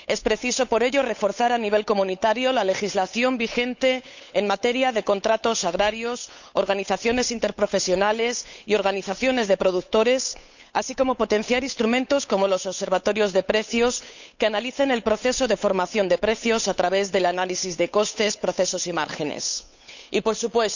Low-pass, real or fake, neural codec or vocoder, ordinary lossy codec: 7.2 kHz; fake; codec, 16 kHz, 8 kbps, FunCodec, trained on Chinese and English, 25 frames a second; none